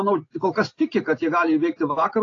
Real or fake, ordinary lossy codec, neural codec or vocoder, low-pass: real; AAC, 32 kbps; none; 7.2 kHz